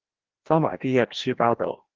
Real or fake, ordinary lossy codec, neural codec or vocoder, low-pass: fake; Opus, 16 kbps; codec, 16 kHz, 1 kbps, FreqCodec, larger model; 7.2 kHz